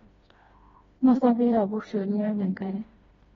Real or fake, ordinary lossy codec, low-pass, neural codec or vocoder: fake; AAC, 24 kbps; 7.2 kHz; codec, 16 kHz, 1 kbps, FreqCodec, smaller model